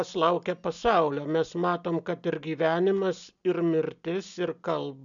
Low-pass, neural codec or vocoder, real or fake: 7.2 kHz; none; real